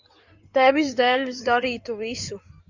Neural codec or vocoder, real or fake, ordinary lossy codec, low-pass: codec, 16 kHz in and 24 kHz out, 2.2 kbps, FireRedTTS-2 codec; fake; Opus, 64 kbps; 7.2 kHz